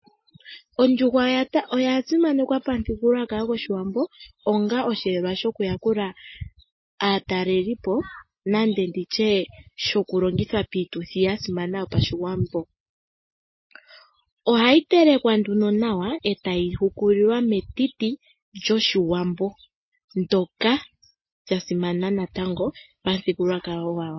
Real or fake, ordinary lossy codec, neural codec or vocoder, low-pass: real; MP3, 24 kbps; none; 7.2 kHz